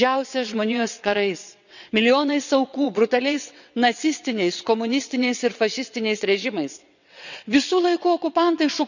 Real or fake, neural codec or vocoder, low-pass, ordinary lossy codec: fake; vocoder, 22.05 kHz, 80 mel bands, WaveNeXt; 7.2 kHz; none